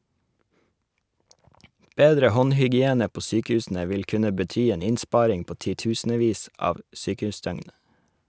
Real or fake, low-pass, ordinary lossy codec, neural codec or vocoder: real; none; none; none